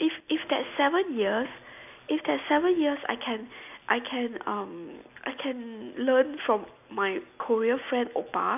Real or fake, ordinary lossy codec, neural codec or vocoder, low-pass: real; none; none; 3.6 kHz